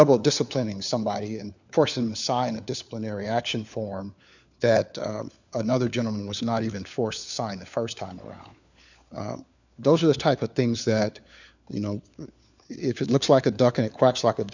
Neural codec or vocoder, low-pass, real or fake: codec, 16 kHz, 4 kbps, FunCodec, trained on LibriTTS, 50 frames a second; 7.2 kHz; fake